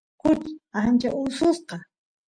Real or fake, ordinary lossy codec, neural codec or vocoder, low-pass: real; MP3, 64 kbps; none; 9.9 kHz